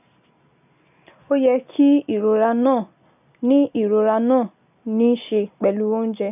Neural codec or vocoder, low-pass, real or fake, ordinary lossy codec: none; 3.6 kHz; real; none